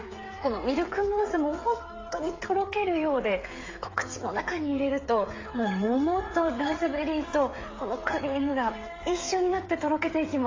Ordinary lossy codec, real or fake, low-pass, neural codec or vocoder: AAC, 32 kbps; fake; 7.2 kHz; codec, 16 kHz, 8 kbps, FreqCodec, smaller model